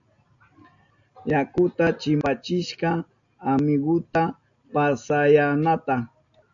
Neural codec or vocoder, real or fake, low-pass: none; real; 7.2 kHz